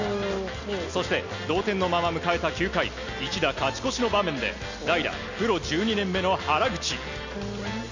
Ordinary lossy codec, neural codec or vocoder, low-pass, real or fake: none; none; 7.2 kHz; real